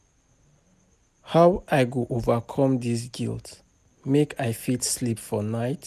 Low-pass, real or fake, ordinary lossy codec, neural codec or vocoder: 14.4 kHz; real; none; none